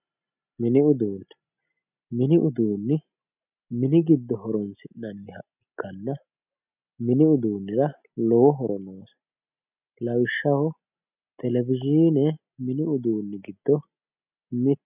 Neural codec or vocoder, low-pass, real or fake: none; 3.6 kHz; real